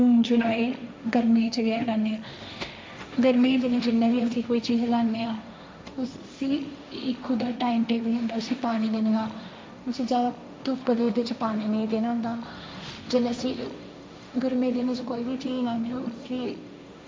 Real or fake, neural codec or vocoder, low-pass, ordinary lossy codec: fake; codec, 16 kHz, 1.1 kbps, Voila-Tokenizer; none; none